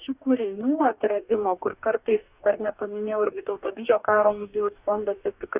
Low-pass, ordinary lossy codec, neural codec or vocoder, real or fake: 3.6 kHz; Opus, 64 kbps; codec, 44.1 kHz, 2.6 kbps, DAC; fake